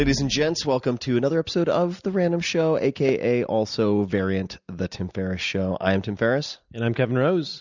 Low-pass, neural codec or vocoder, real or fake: 7.2 kHz; none; real